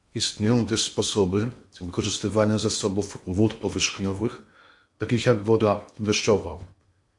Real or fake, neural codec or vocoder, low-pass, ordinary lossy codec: fake; codec, 16 kHz in and 24 kHz out, 0.8 kbps, FocalCodec, streaming, 65536 codes; 10.8 kHz; MP3, 64 kbps